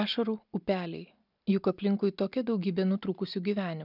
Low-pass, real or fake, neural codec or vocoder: 5.4 kHz; real; none